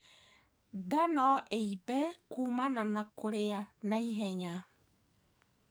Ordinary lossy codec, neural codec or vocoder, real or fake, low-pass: none; codec, 44.1 kHz, 2.6 kbps, SNAC; fake; none